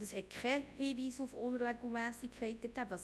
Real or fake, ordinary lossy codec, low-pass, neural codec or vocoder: fake; none; none; codec, 24 kHz, 0.9 kbps, WavTokenizer, large speech release